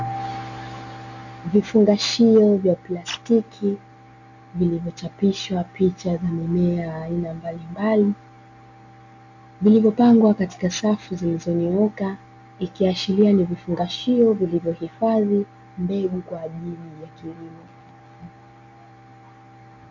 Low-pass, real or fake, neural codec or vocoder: 7.2 kHz; real; none